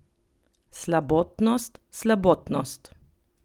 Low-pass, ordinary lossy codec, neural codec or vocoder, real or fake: 19.8 kHz; Opus, 24 kbps; vocoder, 44.1 kHz, 128 mel bands every 256 samples, BigVGAN v2; fake